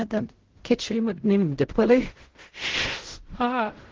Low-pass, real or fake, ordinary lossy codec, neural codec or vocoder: 7.2 kHz; fake; Opus, 16 kbps; codec, 16 kHz in and 24 kHz out, 0.4 kbps, LongCat-Audio-Codec, fine tuned four codebook decoder